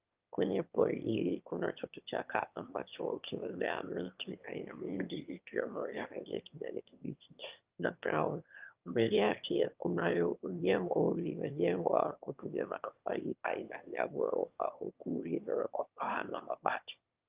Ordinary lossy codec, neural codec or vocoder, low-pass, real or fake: Opus, 24 kbps; autoencoder, 22.05 kHz, a latent of 192 numbers a frame, VITS, trained on one speaker; 3.6 kHz; fake